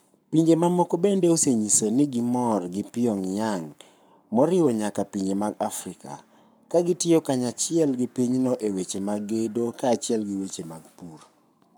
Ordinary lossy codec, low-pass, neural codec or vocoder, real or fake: none; none; codec, 44.1 kHz, 7.8 kbps, Pupu-Codec; fake